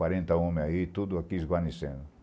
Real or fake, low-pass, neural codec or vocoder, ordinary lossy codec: real; none; none; none